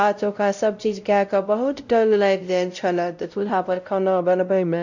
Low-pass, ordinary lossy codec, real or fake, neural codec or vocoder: 7.2 kHz; none; fake; codec, 16 kHz, 0.5 kbps, X-Codec, WavLM features, trained on Multilingual LibriSpeech